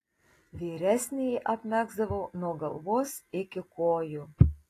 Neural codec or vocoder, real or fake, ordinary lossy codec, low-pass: none; real; AAC, 48 kbps; 14.4 kHz